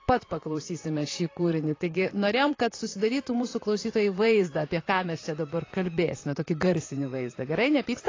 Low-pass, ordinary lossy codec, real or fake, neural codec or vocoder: 7.2 kHz; AAC, 32 kbps; fake; vocoder, 44.1 kHz, 128 mel bands, Pupu-Vocoder